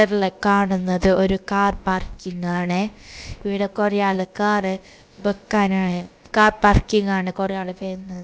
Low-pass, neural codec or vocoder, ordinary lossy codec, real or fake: none; codec, 16 kHz, about 1 kbps, DyCAST, with the encoder's durations; none; fake